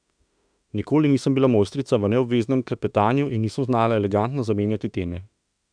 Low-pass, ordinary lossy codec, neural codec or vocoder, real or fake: 9.9 kHz; none; autoencoder, 48 kHz, 32 numbers a frame, DAC-VAE, trained on Japanese speech; fake